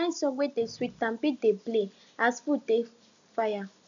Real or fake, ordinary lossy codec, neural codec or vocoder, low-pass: real; none; none; 7.2 kHz